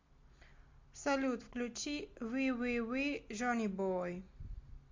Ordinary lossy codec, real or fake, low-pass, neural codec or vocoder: MP3, 48 kbps; real; 7.2 kHz; none